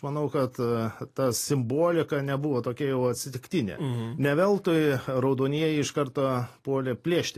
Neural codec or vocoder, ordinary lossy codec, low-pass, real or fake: none; AAC, 48 kbps; 14.4 kHz; real